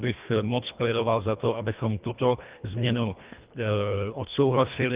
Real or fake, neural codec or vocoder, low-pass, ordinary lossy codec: fake; codec, 24 kHz, 1.5 kbps, HILCodec; 3.6 kHz; Opus, 24 kbps